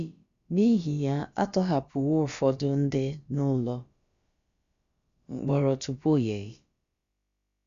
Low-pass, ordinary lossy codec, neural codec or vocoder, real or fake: 7.2 kHz; Opus, 64 kbps; codec, 16 kHz, about 1 kbps, DyCAST, with the encoder's durations; fake